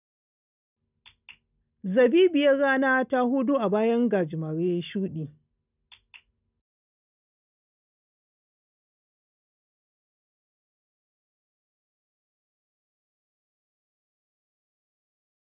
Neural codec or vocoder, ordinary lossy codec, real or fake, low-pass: none; none; real; 3.6 kHz